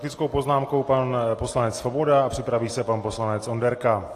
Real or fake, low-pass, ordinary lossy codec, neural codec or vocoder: real; 14.4 kHz; AAC, 48 kbps; none